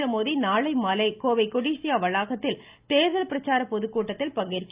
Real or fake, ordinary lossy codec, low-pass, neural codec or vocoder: real; Opus, 24 kbps; 3.6 kHz; none